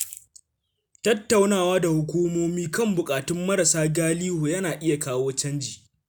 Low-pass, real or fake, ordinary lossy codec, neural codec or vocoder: none; real; none; none